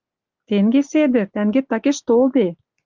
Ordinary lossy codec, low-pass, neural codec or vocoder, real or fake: Opus, 24 kbps; 7.2 kHz; none; real